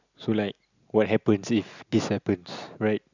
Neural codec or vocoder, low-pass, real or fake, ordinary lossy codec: none; 7.2 kHz; real; none